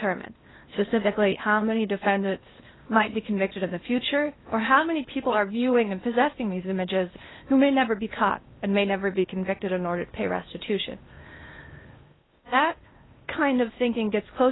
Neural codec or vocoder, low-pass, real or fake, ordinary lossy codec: codec, 16 kHz in and 24 kHz out, 0.8 kbps, FocalCodec, streaming, 65536 codes; 7.2 kHz; fake; AAC, 16 kbps